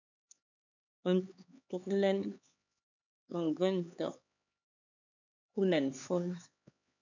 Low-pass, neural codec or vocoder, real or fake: 7.2 kHz; codec, 16 kHz, 4 kbps, X-Codec, HuBERT features, trained on LibriSpeech; fake